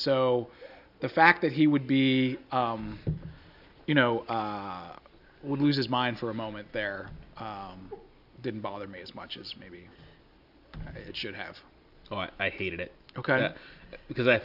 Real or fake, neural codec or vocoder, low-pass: real; none; 5.4 kHz